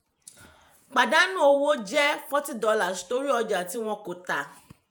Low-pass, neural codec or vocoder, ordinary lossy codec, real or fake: none; vocoder, 48 kHz, 128 mel bands, Vocos; none; fake